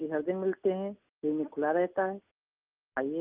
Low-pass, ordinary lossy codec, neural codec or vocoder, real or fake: 3.6 kHz; Opus, 24 kbps; none; real